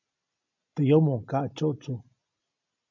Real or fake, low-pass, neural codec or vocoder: real; 7.2 kHz; none